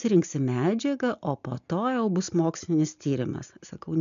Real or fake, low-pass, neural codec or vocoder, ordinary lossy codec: real; 7.2 kHz; none; MP3, 64 kbps